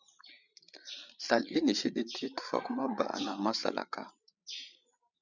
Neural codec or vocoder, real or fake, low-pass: codec, 16 kHz, 8 kbps, FreqCodec, larger model; fake; 7.2 kHz